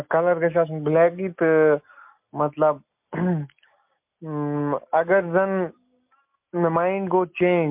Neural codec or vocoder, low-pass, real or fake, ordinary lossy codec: none; 3.6 kHz; real; MP3, 32 kbps